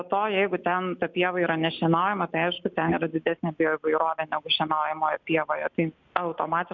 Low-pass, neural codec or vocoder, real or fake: 7.2 kHz; none; real